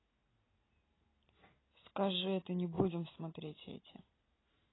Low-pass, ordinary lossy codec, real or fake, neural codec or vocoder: 7.2 kHz; AAC, 16 kbps; real; none